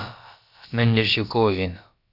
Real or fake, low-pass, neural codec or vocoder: fake; 5.4 kHz; codec, 16 kHz, about 1 kbps, DyCAST, with the encoder's durations